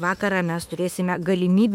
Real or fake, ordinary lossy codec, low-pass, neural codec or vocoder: fake; MP3, 96 kbps; 19.8 kHz; autoencoder, 48 kHz, 32 numbers a frame, DAC-VAE, trained on Japanese speech